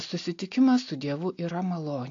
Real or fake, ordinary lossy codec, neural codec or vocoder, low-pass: real; AAC, 48 kbps; none; 7.2 kHz